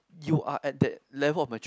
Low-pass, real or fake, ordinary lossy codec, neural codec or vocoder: none; real; none; none